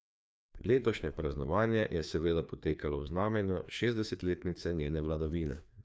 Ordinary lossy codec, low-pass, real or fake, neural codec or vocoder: none; none; fake; codec, 16 kHz, 2 kbps, FreqCodec, larger model